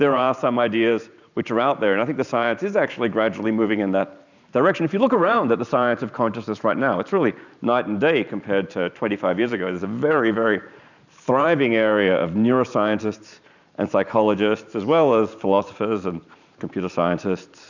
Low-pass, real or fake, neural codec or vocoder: 7.2 kHz; fake; vocoder, 44.1 kHz, 128 mel bands every 512 samples, BigVGAN v2